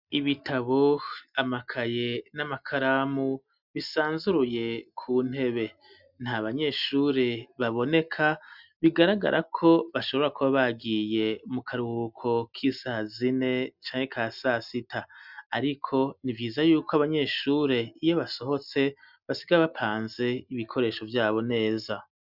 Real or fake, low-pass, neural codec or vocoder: real; 5.4 kHz; none